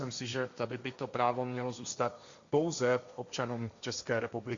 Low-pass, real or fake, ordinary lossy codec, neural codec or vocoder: 7.2 kHz; fake; Opus, 64 kbps; codec, 16 kHz, 1.1 kbps, Voila-Tokenizer